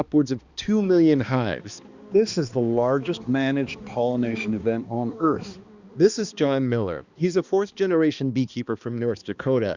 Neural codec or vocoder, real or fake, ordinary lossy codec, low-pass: codec, 16 kHz, 2 kbps, X-Codec, HuBERT features, trained on balanced general audio; fake; Opus, 64 kbps; 7.2 kHz